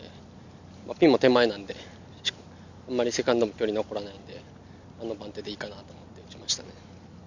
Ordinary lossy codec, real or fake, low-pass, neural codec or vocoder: none; real; 7.2 kHz; none